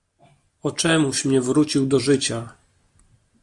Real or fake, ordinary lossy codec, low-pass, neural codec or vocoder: real; AAC, 64 kbps; 10.8 kHz; none